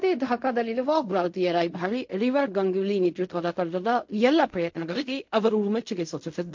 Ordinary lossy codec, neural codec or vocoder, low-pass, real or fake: MP3, 48 kbps; codec, 16 kHz in and 24 kHz out, 0.4 kbps, LongCat-Audio-Codec, fine tuned four codebook decoder; 7.2 kHz; fake